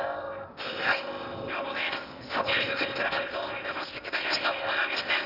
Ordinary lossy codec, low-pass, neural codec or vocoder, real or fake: none; 5.4 kHz; codec, 16 kHz in and 24 kHz out, 0.8 kbps, FocalCodec, streaming, 65536 codes; fake